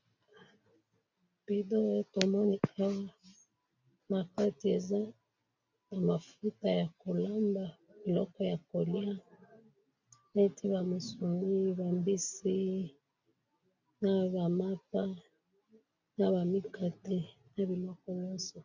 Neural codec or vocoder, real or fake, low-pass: none; real; 7.2 kHz